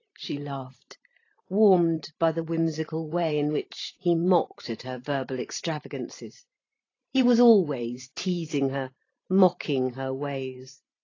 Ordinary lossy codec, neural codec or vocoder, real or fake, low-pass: AAC, 32 kbps; vocoder, 44.1 kHz, 128 mel bands every 512 samples, BigVGAN v2; fake; 7.2 kHz